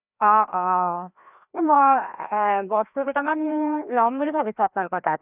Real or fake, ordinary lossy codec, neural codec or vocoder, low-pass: fake; none; codec, 16 kHz, 1 kbps, FreqCodec, larger model; 3.6 kHz